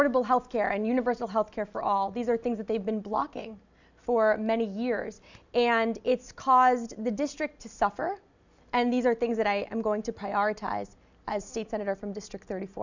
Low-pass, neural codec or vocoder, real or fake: 7.2 kHz; none; real